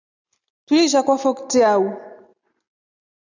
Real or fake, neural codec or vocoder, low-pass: real; none; 7.2 kHz